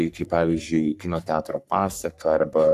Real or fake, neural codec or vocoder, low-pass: fake; codec, 44.1 kHz, 3.4 kbps, Pupu-Codec; 14.4 kHz